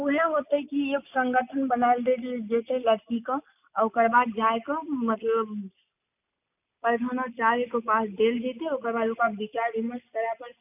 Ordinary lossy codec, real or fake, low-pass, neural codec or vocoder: none; real; 3.6 kHz; none